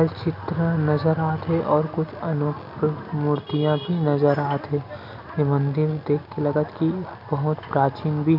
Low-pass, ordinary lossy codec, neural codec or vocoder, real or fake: 5.4 kHz; none; none; real